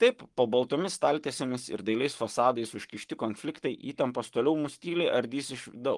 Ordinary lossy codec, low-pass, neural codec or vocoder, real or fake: Opus, 24 kbps; 10.8 kHz; codec, 44.1 kHz, 7.8 kbps, Pupu-Codec; fake